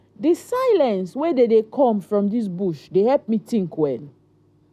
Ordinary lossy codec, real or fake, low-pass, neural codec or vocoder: none; real; 14.4 kHz; none